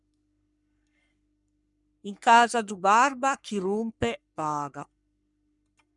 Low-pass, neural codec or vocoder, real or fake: 10.8 kHz; codec, 44.1 kHz, 3.4 kbps, Pupu-Codec; fake